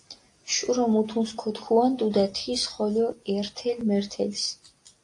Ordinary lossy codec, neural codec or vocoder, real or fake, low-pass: AAC, 48 kbps; none; real; 10.8 kHz